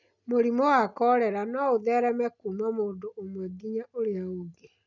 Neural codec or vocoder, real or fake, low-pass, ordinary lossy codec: none; real; 7.2 kHz; none